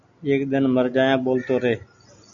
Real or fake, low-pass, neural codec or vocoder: real; 7.2 kHz; none